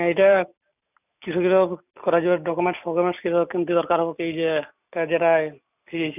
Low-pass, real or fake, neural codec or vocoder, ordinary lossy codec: 3.6 kHz; real; none; none